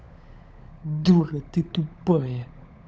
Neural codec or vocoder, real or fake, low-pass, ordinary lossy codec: codec, 16 kHz, 16 kbps, FunCodec, trained on LibriTTS, 50 frames a second; fake; none; none